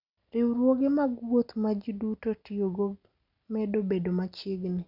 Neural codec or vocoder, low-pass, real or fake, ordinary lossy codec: none; 5.4 kHz; real; none